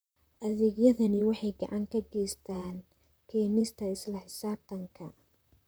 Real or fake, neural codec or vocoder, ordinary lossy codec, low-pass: fake; vocoder, 44.1 kHz, 128 mel bands, Pupu-Vocoder; none; none